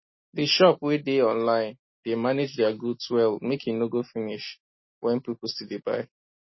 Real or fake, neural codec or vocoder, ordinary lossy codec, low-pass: real; none; MP3, 24 kbps; 7.2 kHz